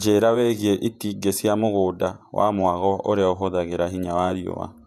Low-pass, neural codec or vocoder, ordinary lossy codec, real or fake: 14.4 kHz; vocoder, 48 kHz, 128 mel bands, Vocos; none; fake